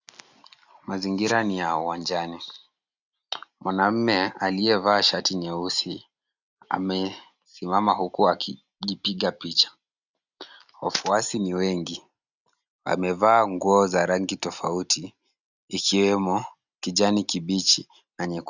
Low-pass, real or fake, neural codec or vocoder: 7.2 kHz; real; none